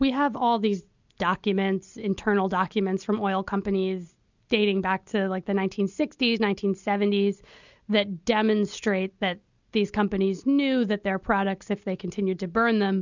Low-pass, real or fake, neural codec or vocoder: 7.2 kHz; real; none